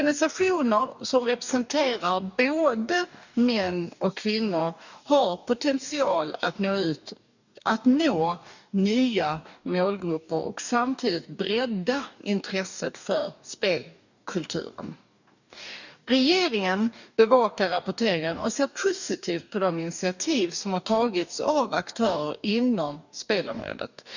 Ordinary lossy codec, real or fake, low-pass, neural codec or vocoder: none; fake; 7.2 kHz; codec, 44.1 kHz, 2.6 kbps, DAC